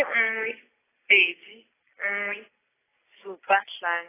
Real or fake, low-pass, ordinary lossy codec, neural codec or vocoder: real; 3.6 kHz; AAC, 16 kbps; none